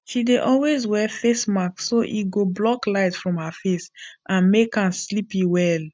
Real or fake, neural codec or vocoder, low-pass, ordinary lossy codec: real; none; none; none